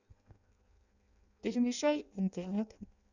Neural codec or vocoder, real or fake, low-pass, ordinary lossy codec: codec, 16 kHz in and 24 kHz out, 0.6 kbps, FireRedTTS-2 codec; fake; 7.2 kHz; none